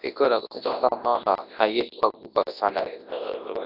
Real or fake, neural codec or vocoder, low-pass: fake; codec, 24 kHz, 0.9 kbps, WavTokenizer, large speech release; 5.4 kHz